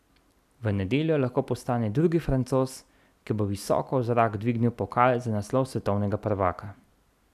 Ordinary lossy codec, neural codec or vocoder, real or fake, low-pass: none; none; real; 14.4 kHz